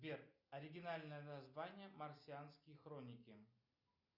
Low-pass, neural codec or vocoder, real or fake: 5.4 kHz; none; real